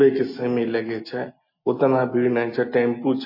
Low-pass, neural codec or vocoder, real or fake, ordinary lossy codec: 5.4 kHz; none; real; MP3, 24 kbps